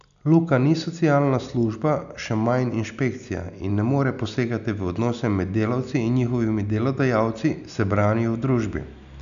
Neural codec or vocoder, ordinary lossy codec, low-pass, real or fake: none; none; 7.2 kHz; real